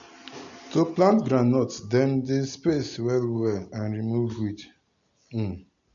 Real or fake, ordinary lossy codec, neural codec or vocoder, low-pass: real; none; none; 7.2 kHz